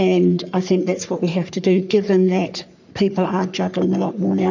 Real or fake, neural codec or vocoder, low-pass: fake; codec, 44.1 kHz, 3.4 kbps, Pupu-Codec; 7.2 kHz